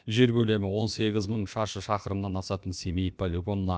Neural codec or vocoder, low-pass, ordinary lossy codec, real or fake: codec, 16 kHz, about 1 kbps, DyCAST, with the encoder's durations; none; none; fake